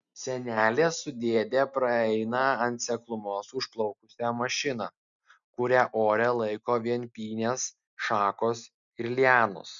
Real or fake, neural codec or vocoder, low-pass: real; none; 7.2 kHz